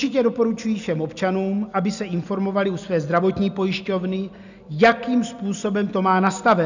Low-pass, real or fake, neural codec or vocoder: 7.2 kHz; real; none